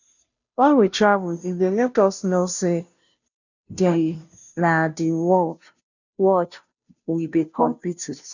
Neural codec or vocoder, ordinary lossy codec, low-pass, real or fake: codec, 16 kHz, 0.5 kbps, FunCodec, trained on Chinese and English, 25 frames a second; none; 7.2 kHz; fake